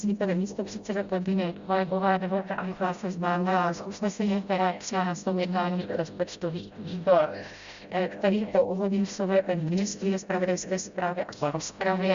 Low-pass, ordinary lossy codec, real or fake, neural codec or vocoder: 7.2 kHz; Opus, 64 kbps; fake; codec, 16 kHz, 0.5 kbps, FreqCodec, smaller model